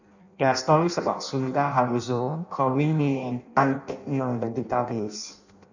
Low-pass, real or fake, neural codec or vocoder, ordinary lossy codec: 7.2 kHz; fake; codec, 16 kHz in and 24 kHz out, 0.6 kbps, FireRedTTS-2 codec; none